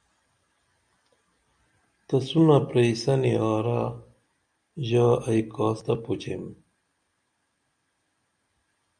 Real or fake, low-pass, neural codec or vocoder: real; 9.9 kHz; none